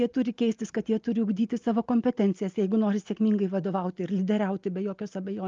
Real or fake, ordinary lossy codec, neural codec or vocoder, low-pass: real; Opus, 32 kbps; none; 7.2 kHz